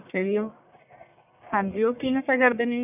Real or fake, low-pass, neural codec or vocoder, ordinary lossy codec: fake; 3.6 kHz; codec, 44.1 kHz, 1.7 kbps, Pupu-Codec; none